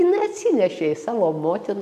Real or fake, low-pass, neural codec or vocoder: real; 14.4 kHz; none